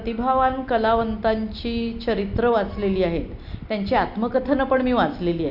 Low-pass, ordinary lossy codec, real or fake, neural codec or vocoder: 5.4 kHz; none; real; none